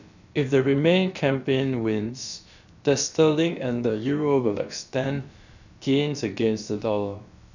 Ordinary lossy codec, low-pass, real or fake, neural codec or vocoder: none; 7.2 kHz; fake; codec, 16 kHz, about 1 kbps, DyCAST, with the encoder's durations